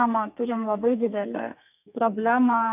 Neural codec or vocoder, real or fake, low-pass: codec, 32 kHz, 1.9 kbps, SNAC; fake; 3.6 kHz